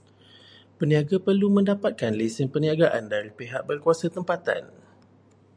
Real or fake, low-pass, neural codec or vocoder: real; 9.9 kHz; none